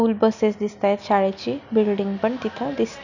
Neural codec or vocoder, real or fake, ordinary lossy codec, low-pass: none; real; none; 7.2 kHz